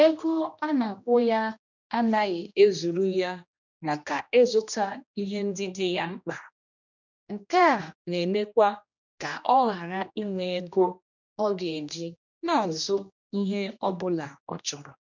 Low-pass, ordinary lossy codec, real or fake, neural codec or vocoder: 7.2 kHz; none; fake; codec, 16 kHz, 1 kbps, X-Codec, HuBERT features, trained on general audio